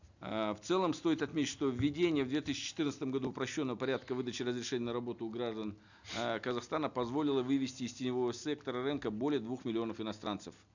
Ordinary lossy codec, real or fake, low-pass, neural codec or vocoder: none; real; 7.2 kHz; none